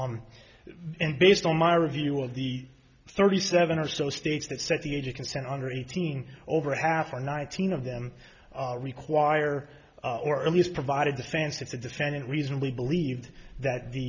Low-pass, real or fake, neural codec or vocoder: 7.2 kHz; real; none